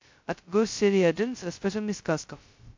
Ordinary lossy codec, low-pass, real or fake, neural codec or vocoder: MP3, 48 kbps; 7.2 kHz; fake; codec, 16 kHz, 0.2 kbps, FocalCodec